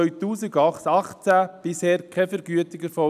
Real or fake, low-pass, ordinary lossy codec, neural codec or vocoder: real; 14.4 kHz; none; none